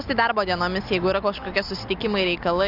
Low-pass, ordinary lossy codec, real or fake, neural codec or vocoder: 5.4 kHz; Opus, 64 kbps; real; none